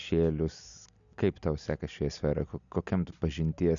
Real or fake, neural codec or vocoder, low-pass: real; none; 7.2 kHz